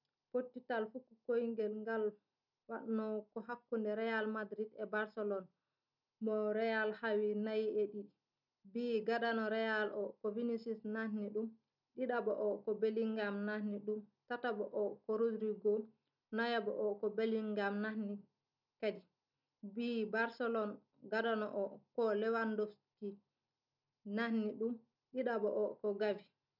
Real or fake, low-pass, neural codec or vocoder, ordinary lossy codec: real; 5.4 kHz; none; none